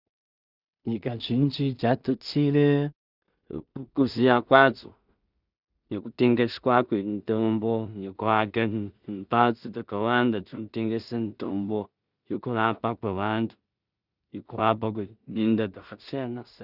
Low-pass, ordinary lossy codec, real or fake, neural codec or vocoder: 5.4 kHz; Opus, 64 kbps; fake; codec, 16 kHz in and 24 kHz out, 0.4 kbps, LongCat-Audio-Codec, two codebook decoder